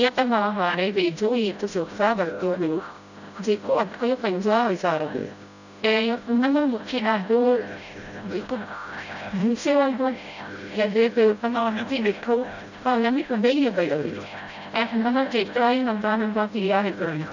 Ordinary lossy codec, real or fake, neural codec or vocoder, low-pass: none; fake; codec, 16 kHz, 0.5 kbps, FreqCodec, smaller model; 7.2 kHz